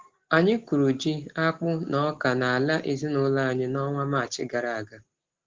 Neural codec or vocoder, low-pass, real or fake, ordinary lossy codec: none; 7.2 kHz; real; Opus, 16 kbps